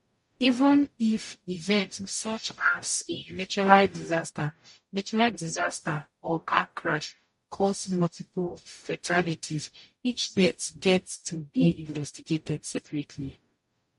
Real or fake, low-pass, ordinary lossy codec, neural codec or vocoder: fake; 14.4 kHz; MP3, 48 kbps; codec, 44.1 kHz, 0.9 kbps, DAC